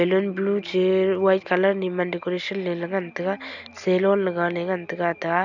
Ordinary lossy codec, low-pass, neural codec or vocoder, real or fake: none; 7.2 kHz; none; real